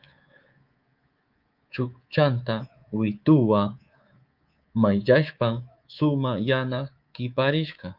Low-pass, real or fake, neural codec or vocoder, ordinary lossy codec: 5.4 kHz; fake; codec, 24 kHz, 3.1 kbps, DualCodec; Opus, 32 kbps